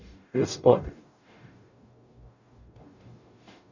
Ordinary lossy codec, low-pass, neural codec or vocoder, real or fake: none; 7.2 kHz; codec, 44.1 kHz, 0.9 kbps, DAC; fake